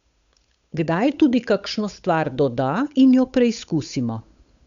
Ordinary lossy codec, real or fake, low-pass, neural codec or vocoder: Opus, 64 kbps; fake; 7.2 kHz; codec, 16 kHz, 8 kbps, FunCodec, trained on Chinese and English, 25 frames a second